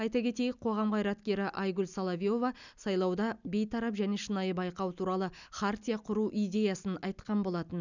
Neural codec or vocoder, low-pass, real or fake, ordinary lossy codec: none; 7.2 kHz; real; none